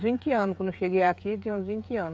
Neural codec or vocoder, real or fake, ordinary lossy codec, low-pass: codec, 16 kHz, 8 kbps, FreqCodec, smaller model; fake; none; none